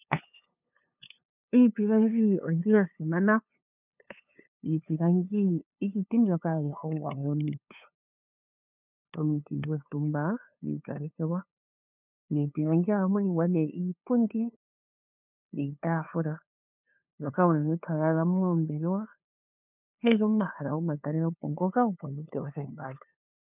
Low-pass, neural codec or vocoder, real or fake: 3.6 kHz; codec, 16 kHz, 2 kbps, FunCodec, trained on LibriTTS, 25 frames a second; fake